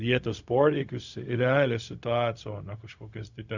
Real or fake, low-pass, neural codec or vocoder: fake; 7.2 kHz; codec, 16 kHz, 0.4 kbps, LongCat-Audio-Codec